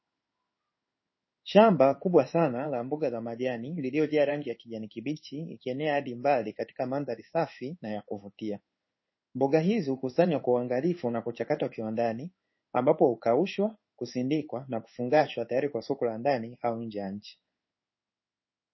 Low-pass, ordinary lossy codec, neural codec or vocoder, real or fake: 7.2 kHz; MP3, 24 kbps; codec, 16 kHz in and 24 kHz out, 1 kbps, XY-Tokenizer; fake